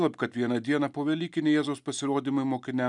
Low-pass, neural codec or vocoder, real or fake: 10.8 kHz; none; real